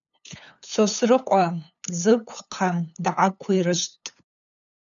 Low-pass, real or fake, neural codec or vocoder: 7.2 kHz; fake; codec, 16 kHz, 8 kbps, FunCodec, trained on LibriTTS, 25 frames a second